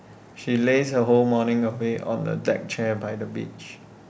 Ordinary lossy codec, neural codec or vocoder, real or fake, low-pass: none; none; real; none